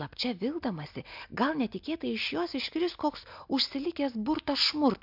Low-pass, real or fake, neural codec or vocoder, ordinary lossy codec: 5.4 kHz; real; none; MP3, 48 kbps